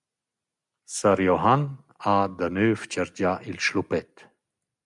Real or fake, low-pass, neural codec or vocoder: real; 10.8 kHz; none